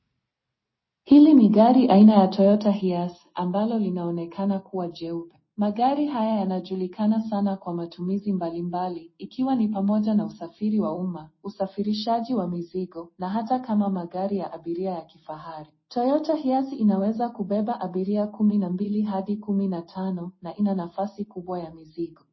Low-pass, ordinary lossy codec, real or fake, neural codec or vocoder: 7.2 kHz; MP3, 24 kbps; real; none